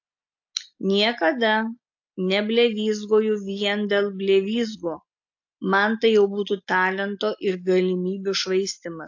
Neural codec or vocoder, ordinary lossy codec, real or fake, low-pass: none; AAC, 48 kbps; real; 7.2 kHz